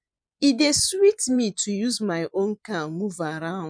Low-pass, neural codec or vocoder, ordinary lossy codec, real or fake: 9.9 kHz; vocoder, 24 kHz, 100 mel bands, Vocos; none; fake